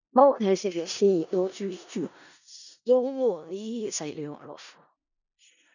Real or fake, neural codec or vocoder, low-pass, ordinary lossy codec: fake; codec, 16 kHz in and 24 kHz out, 0.4 kbps, LongCat-Audio-Codec, four codebook decoder; 7.2 kHz; none